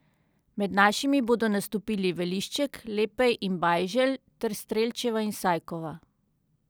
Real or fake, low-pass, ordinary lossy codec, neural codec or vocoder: real; none; none; none